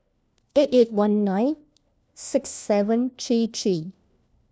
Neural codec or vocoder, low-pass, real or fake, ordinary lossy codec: codec, 16 kHz, 1 kbps, FunCodec, trained on LibriTTS, 50 frames a second; none; fake; none